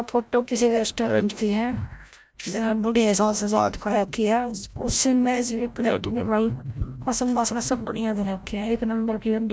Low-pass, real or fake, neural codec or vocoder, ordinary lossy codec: none; fake; codec, 16 kHz, 0.5 kbps, FreqCodec, larger model; none